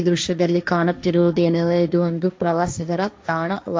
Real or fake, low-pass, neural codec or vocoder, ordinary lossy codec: fake; none; codec, 16 kHz, 1.1 kbps, Voila-Tokenizer; none